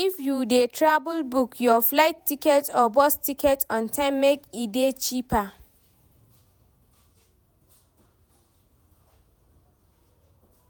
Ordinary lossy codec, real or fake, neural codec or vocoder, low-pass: none; fake; vocoder, 48 kHz, 128 mel bands, Vocos; none